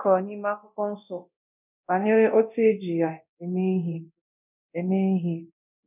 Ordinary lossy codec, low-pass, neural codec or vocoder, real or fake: none; 3.6 kHz; codec, 24 kHz, 0.9 kbps, DualCodec; fake